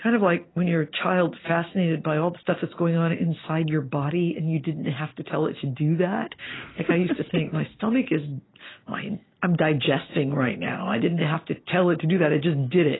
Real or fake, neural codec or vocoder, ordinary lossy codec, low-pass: real; none; AAC, 16 kbps; 7.2 kHz